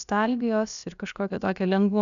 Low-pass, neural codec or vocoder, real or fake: 7.2 kHz; codec, 16 kHz, about 1 kbps, DyCAST, with the encoder's durations; fake